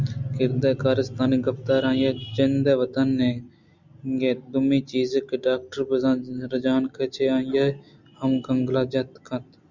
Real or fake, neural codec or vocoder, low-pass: real; none; 7.2 kHz